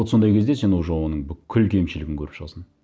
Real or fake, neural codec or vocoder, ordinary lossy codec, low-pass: real; none; none; none